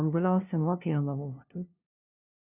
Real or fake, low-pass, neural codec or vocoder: fake; 3.6 kHz; codec, 16 kHz, 0.5 kbps, FunCodec, trained on LibriTTS, 25 frames a second